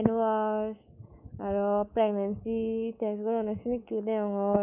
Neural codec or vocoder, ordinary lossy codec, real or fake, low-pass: codec, 24 kHz, 3.1 kbps, DualCodec; none; fake; 3.6 kHz